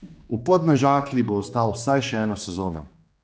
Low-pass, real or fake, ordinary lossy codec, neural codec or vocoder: none; fake; none; codec, 16 kHz, 2 kbps, X-Codec, HuBERT features, trained on general audio